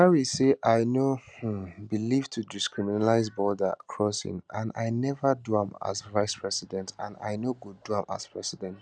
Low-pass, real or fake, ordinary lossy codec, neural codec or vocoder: 9.9 kHz; real; none; none